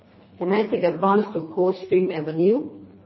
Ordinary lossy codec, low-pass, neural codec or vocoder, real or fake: MP3, 24 kbps; 7.2 kHz; codec, 24 kHz, 1.5 kbps, HILCodec; fake